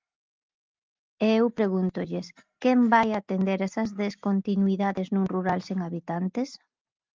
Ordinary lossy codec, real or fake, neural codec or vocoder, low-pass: Opus, 24 kbps; real; none; 7.2 kHz